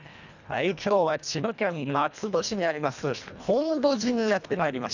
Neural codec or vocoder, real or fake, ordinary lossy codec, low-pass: codec, 24 kHz, 1.5 kbps, HILCodec; fake; none; 7.2 kHz